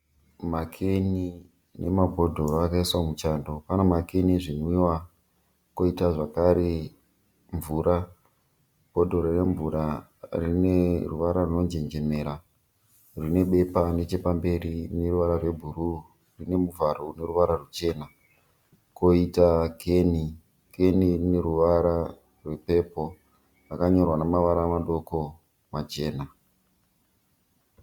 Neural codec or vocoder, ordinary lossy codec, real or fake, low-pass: none; Opus, 64 kbps; real; 19.8 kHz